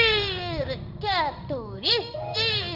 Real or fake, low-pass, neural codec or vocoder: fake; 5.4 kHz; codec, 16 kHz in and 24 kHz out, 1 kbps, XY-Tokenizer